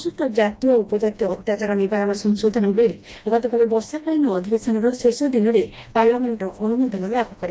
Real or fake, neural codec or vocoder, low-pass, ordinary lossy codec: fake; codec, 16 kHz, 1 kbps, FreqCodec, smaller model; none; none